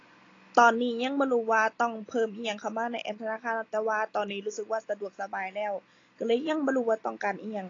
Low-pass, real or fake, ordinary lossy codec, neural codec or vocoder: 7.2 kHz; real; AAC, 32 kbps; none